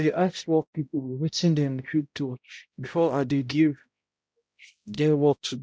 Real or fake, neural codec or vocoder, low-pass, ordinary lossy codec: fake; codec, 16 kHz, 0.5 kbps, X-Codec, HuBERT features, trained on balanced general audio; none; none